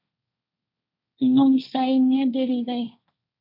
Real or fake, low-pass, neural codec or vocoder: fake; 5.4 kHz; codec, 16 kHz, 1.1 kbps, Voila-Tokenizer